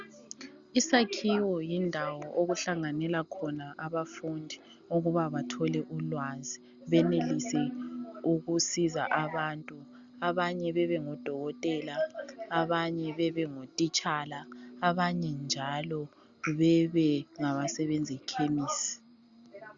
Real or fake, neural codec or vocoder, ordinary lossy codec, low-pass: real; none; Opus, 64 kbps; 7.2 kHz